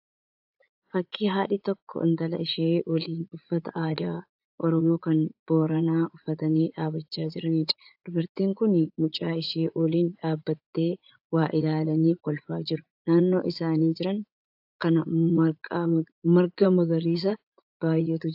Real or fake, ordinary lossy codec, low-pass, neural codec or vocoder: fake; AAC, 48 kbps; 5.4 kHz; vocoder, 44.1 kHz, 80 mel bands, Vocos